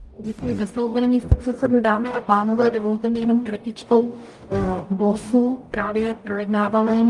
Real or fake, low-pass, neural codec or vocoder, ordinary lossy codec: fake; 10.8 kHz; codec, 44.1 kHz, 0.9 kbps, DAC; Opus, 32 kbps